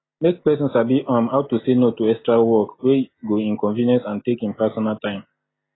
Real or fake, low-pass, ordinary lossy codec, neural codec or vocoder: real; 7.2 kHz; AAC, 16 kbps; none